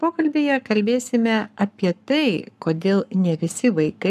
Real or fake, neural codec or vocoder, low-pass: fake; codec, 44.1 kHz, 7.8 kbps, Pupu-Codec; 14.4 kHz